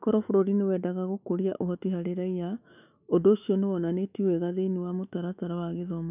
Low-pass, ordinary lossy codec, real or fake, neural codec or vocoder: 3.6 kHz; none; fake; autoencoder, 48 kHz, 128 numbers a frame, DAC-VAE, trained on Japanese speech